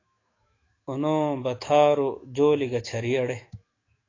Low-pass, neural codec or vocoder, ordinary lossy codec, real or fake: 7.2 kHz; autoencoder, 48 kHz, 128 numbers a frame, DAC-VAE, trained on Japanese speech; AAC, 32 kbps; fake